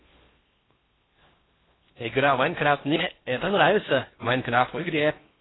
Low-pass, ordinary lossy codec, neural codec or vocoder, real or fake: 7.2 kHz; AAC, 16 kbps; codec, 16 kHz in and 24 kHz out, 0.8 kbps, FocalCodec, streaming, 65536 codes; fake